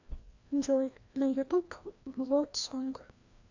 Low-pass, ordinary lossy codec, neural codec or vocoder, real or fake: 7.2 kHz; AAC, 48 kbps; codec, 16 kHz, 1 kbps, FreqCodec, larger model; fake